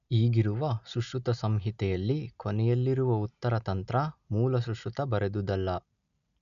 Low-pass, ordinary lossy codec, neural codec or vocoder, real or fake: 7.2 kHz; none; none; real